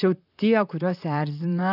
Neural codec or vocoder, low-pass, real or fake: vocoder, 44.1 kHz, 128 mel bands, Pupu-Vocoder; 5.4 kHz; fake